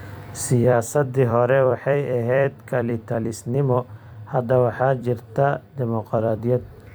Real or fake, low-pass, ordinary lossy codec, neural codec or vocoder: fake; none; none; vocoder, 44.1 kHz, 128 mel bands every 256 samples, BigVGAN v2